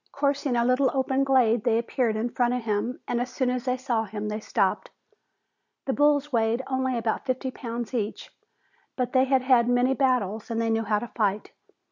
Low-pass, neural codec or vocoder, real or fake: 7.2 kHz; none; real